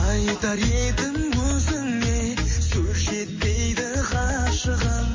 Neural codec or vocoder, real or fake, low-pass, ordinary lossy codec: none; real; 7.2 kHz; MP3, 32 kbps